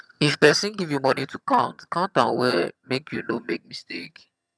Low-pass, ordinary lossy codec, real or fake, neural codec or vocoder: none; none; fake; vocoder, 22.05 kHz, 80 mel bands, HiFi-GAN